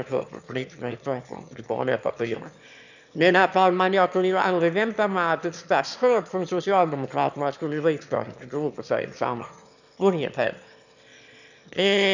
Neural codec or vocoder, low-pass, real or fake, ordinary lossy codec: autoencoder, 22.05 kHz, a latent of 192 numbers a frame, VITS, trained on one speaker; 7.2 kHz; fake; none